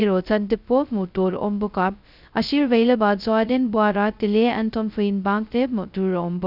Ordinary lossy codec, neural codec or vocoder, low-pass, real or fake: none; codec, 16 kHz, 0.2 kbps, FocalCodec; 5.4 kHz; fake